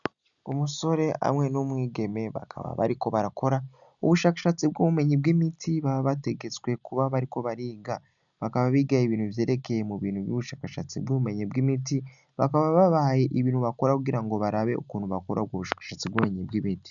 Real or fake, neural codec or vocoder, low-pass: real; none; 7.2 kHz